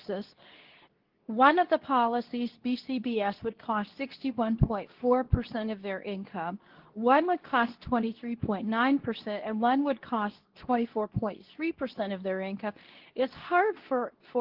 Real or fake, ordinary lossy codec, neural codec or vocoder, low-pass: fake; Opus, 16 kbps; codec, 24 kHz, 0.9 kbps, WavTokenizer, medium speech release version 2; 5.4 kHz